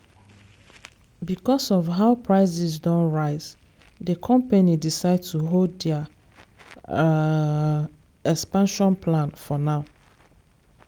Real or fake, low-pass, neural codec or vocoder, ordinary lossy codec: real; 19.8 kHz; none; Opus, 32 kbps